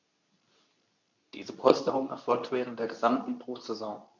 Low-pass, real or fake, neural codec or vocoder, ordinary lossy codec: 7.2 kHz; fake; codec, 24 kHz, 0.9 kbps, WavTokenizer, medium speech release version 2; none